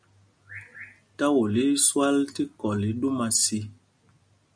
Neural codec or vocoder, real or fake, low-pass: none; real; 9.9 kHz